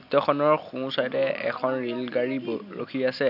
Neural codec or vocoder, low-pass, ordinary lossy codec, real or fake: none; 5.4 kHz; none; real